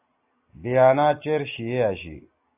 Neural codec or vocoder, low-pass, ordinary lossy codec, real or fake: none; 3.6 kHz; AAC, 32 kbps; real